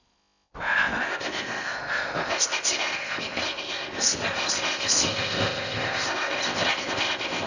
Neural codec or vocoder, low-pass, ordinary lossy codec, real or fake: codec, 16 kHz in and 24 kHz out, 0.6 kbps, FocalCodec, streaming, 4096 codes; 7.2 kHz; none; fake